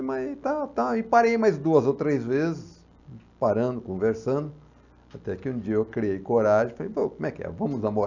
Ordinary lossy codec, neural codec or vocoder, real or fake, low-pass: none; none; real; 7.2 kHz